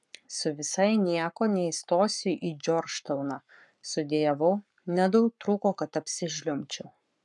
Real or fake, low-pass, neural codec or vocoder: fake; 10.8 kHz; codec, 44.1 kHz, 7.8 kbps, Pupu-Codec